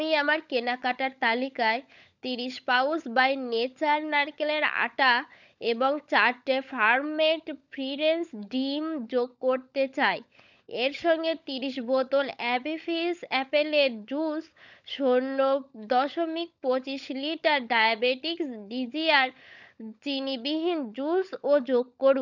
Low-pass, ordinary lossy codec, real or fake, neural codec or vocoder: 7.2 kHz; none; fake; codec, 16 kHz, 16 kbps, FunCodec, trained on LibriTTS, 50 frames a second